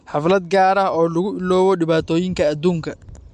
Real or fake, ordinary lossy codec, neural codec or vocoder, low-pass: real; MP3, 64 kbps; none; 10.8 kHz